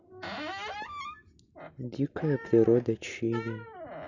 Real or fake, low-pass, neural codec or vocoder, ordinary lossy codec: real; 7.2 kHz; none; none